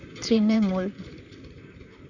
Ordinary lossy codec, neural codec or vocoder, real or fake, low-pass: none; vocoder, 44.1 kHz, 128 mel bands, Pupu-Vocoder; fake; 7.2 kHz